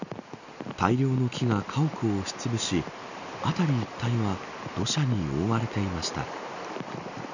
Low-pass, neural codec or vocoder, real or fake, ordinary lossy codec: 7.2 kHz; none; real; none